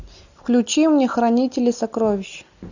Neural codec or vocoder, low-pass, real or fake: none; 7.2 kHz; real